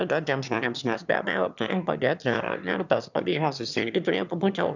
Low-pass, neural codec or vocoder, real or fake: 7.2 kHz; autoencoder, 22.05 kHz, a latent of 192 numbers a frame, VITS, trained on one speaker; fake